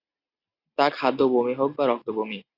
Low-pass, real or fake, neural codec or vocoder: 5.4 kHz; real; none